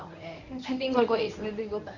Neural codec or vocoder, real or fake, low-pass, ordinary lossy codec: codec, 24 kHz, 0.9 kbps, WavTokenizer, medium speech release version 1; fake; 7.2 kHz; none